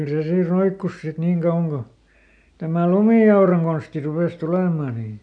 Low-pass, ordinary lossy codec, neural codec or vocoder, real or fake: 9.9 kHz; none; none; real